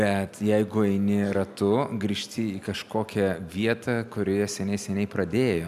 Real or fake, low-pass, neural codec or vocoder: real; 14.4 kHz; none